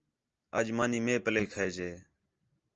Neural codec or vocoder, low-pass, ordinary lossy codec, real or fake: none; 7.2 kHz; Opus, 24 kbps; real